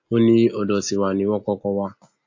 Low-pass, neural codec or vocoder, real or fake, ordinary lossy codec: 7.2 kHz; none; real; AAC, 48 kbps